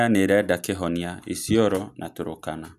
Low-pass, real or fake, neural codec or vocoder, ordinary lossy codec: 14.4 kHz; real; none; none